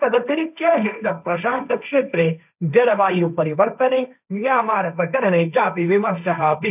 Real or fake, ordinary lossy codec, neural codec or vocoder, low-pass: fake; none; codec, 16 kHz, 1.1 kbps, Voila-Tokenizer; 3.6 kHz